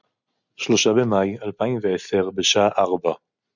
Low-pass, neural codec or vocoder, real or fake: 7.2 kHz; none; real